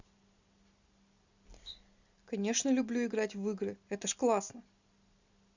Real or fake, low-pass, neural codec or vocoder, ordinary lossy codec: real; 7.2 kHz; none; Opus, 64 kbps